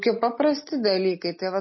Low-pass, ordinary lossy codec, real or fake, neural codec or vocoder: 7.2 kHz; MP3, 24 kbps; real; none